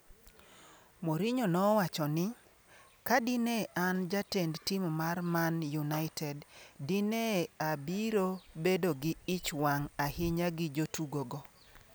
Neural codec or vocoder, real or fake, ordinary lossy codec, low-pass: none; real; none; none